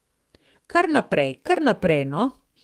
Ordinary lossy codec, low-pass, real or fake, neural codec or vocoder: Opus, 24 kbps; 14.4 kHz; fake; codec, 32 kHz, 1.9 kbps, SNAC